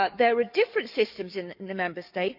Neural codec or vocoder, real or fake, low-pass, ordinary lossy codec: codec, 44.1 kHz, 7.8 kbps, DAC; fake; 5.4 kHz; none